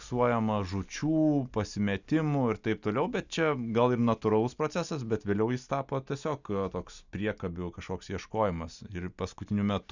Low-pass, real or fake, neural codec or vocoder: 7.2 kHz; real; none